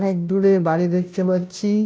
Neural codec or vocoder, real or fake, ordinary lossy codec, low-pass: codec, 16 kHz, 0.5 kbps, FunCodec, trained on Chinese and English, 25 frames a second; fake; none; none